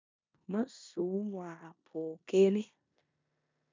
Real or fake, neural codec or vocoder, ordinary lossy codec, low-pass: fake; codec, 16 kHz in and 24 kHz out, 0.9 kbps, LongCat-Audio-Codec, four codebook decoder; MP3, 64 kbps; 7.2 kHz